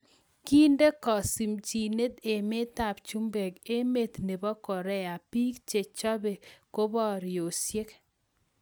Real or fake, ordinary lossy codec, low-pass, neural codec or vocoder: real; none; none; none